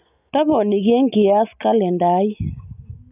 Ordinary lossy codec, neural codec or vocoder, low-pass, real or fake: none; none; 3.6 kHz; real